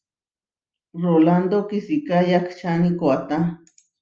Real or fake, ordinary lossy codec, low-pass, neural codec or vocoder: real; Opus, 24 kbps; 7.2 kHz; none